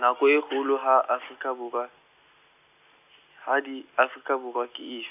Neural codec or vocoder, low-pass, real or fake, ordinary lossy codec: none; 3.6 kHz; real; none